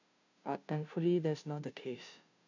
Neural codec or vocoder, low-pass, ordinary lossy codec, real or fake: codec, 16 kHz, 0.5 kbps, FunCodec, trained on Chinese and English, 25 frames a second; 7.2 kHz; none; fake